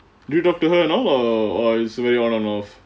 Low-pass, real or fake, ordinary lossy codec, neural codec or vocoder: none; real; none; none